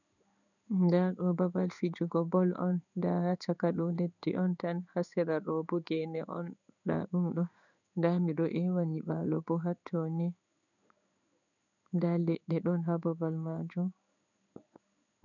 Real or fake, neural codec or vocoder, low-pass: fake; codec, 16 kHz in and 24 kHz out, 1 kbps, XY-Tokenizer; 7.2 kHz